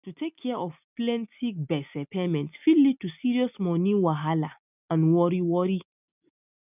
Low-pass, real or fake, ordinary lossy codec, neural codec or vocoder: 3.6 kHz; real; none; none